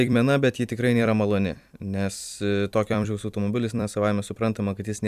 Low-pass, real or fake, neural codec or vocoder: 14.4 kHz; fake; vocoder, 44.1 kHz, 128 mel bands every 256 samples, BigVGAN v2